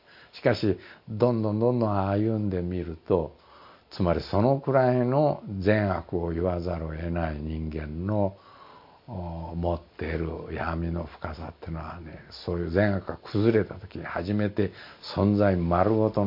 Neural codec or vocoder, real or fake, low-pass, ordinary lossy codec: none; real; 5.4 kHz; MP3, 32 kbps